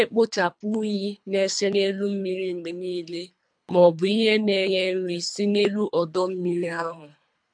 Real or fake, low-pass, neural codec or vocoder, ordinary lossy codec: fake; 9.9 kHz; codec, 24 kHz, 3 kbps, HILCodec; MP3, 64 kbps